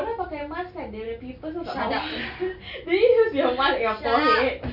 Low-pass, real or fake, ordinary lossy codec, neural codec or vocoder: 5.4 kHz; real; none; none